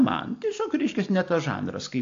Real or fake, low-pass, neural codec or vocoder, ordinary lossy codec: real; 7.2 kHz; none; AAC, 48 kbps